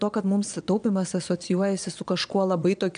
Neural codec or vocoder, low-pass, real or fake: vocoder, 22.05 kHz, 80 mel bands, Vocos; 9.9 kHz; fake